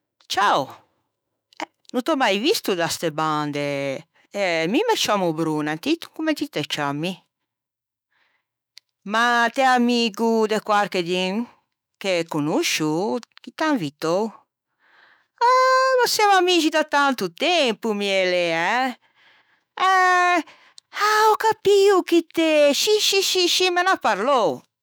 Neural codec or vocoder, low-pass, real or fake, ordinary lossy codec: autoencoder, 48 kHz, 128 numbers a frame, DAC-VAE, trained on Japanese speech; none; fake; none